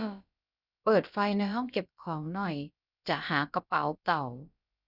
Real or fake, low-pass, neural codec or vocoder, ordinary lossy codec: fake; 5.4 kHz; codec, 16 kHz, about 1 kbps, DyCAST, with the encoder's durations; none